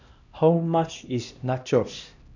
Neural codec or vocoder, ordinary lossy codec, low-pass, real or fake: codec, 16 kHz, 1 kbps, X-Codec, HuBERT features, trained on LibriSpeech; none; 7.2 kHz; fake